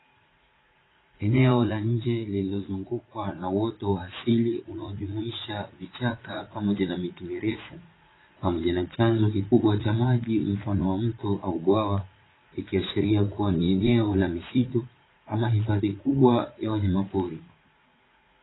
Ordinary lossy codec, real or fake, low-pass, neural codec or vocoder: AAC, 16 kbps; fake; 7.2 kHz; vocoder, 44.1 kHz, 80 mel bands, Vocos